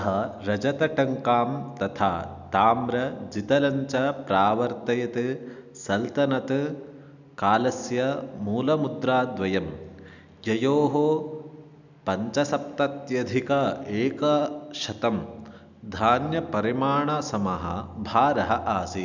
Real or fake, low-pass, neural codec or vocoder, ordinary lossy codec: real; 7.2 kHz; none; none